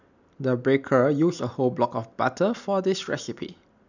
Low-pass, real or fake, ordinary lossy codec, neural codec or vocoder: 7.2 kHz; real; none; none